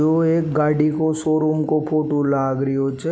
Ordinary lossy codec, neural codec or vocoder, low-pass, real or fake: none; none; none; real